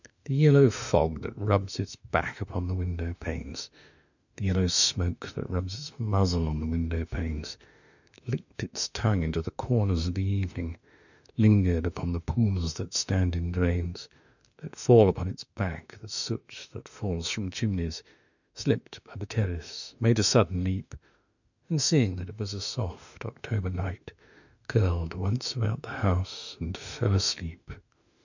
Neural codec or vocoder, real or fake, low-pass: autoencoder, 48 kHz, 32 numbers a frame, DAC-VAE, trained on Japanese speech; fake; 7.2 kHz